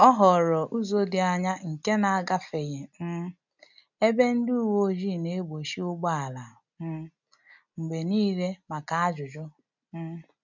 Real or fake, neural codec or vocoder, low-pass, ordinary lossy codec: real; none; 7.2 kHz; none